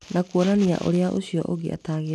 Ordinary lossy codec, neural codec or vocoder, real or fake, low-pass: none; none; real; none